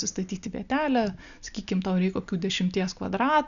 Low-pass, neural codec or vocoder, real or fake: 7.2 kHz; none; real